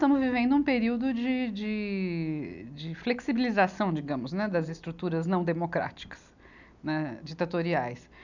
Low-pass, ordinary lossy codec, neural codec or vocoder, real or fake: 7.2 kHz; none; none; real